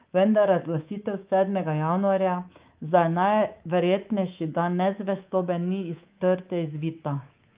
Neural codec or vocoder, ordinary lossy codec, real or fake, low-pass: codec, 24 kHz, 3.1 kbps, DualCodec; Opus, 32 kbps; fake; 3.6 kHz